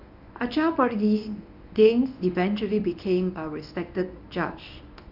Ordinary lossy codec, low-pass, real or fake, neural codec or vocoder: none; 5.4 kHz; fake; codec, 24 kHz, 0.9 kbps, WavTokenizer, medium speech release version 1